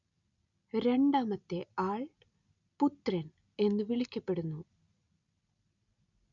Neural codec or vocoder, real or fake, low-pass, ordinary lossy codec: none; real; 7.2 kHz; none